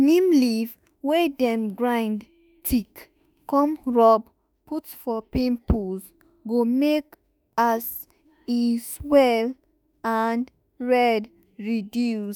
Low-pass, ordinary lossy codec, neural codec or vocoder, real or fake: none; none; autoencoder, 48 kHz, 32 numbers a frame, DAC-VAE, trained on Japanese speech; fake